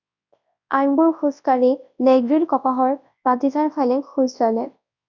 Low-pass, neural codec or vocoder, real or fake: 7.2 kHz; codec, 24 kHz, 0.9 kbps, WavTokenizer, large speech release; fake